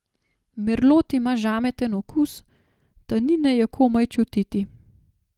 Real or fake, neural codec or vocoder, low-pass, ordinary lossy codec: real; none; 19.8 kHz; Opus, 24 kbps